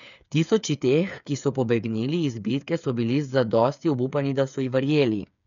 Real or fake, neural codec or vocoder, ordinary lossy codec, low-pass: fake; codec, 16 kHz, 8 kbps, FreqCodec, smaller model; none; 7.2 kHz